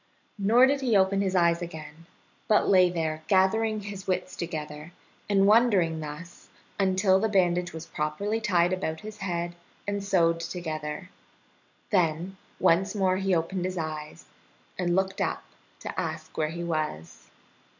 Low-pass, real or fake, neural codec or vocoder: 7.2 kHz; real; none